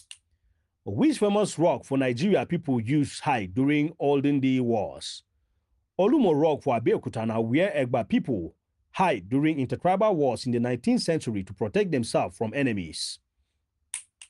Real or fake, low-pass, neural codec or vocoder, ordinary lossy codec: real; 10.8 kHz; none; Opus, 32 kbps